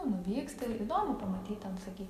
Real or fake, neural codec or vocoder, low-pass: real; none; 14.4 kHz